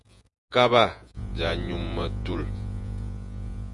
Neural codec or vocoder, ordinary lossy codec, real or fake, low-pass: vocoder, 48 kHz, 128 mel bands, Vocos; MP3, 96 kbps; fake; 10.8 kHz